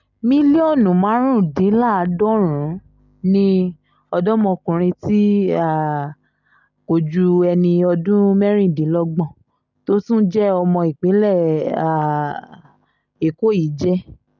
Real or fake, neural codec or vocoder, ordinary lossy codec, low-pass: real; none; none; 7.2 kHz